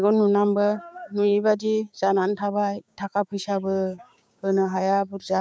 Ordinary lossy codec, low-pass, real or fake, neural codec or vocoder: none; none; fake; codec, 16 kHz, 6 kbps, DAC